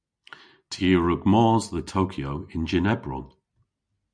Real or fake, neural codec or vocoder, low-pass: real; none; 9.9 kHz